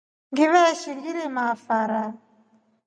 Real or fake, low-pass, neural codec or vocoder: real; 9.9 kHz; none